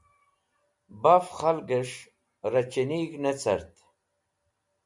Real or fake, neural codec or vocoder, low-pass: real; none; 10.8 kHz